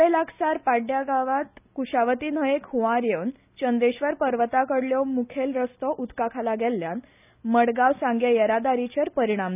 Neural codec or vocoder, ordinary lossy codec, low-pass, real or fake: none; none; 3.6 kHz; real